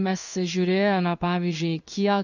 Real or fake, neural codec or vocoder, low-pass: fake; codec, 16 kHz in and 24 kHz out, 1 kbps, XY-Tokenizer; 7.2 kHz